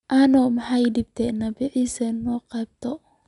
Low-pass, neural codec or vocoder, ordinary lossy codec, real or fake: 10.8 kHz; none; none; real